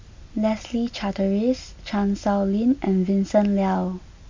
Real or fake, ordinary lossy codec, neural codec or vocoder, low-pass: real; MP3, 48 kbps; none; 7.2 kHz